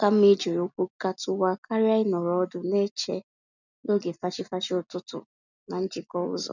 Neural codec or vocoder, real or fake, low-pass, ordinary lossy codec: none; real; 7.2 kHz; none